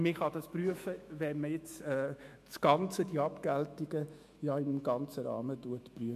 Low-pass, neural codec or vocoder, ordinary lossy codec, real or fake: 14.4 kHz; autoencoder, 48 kHz, 128 numbers a frame, DAC-VAE, trained on Japanese speech; MP3, 64 kbps; fake